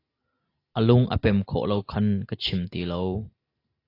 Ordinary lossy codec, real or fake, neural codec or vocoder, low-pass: AAC, 32 kbps; real; none; 5.4 kHz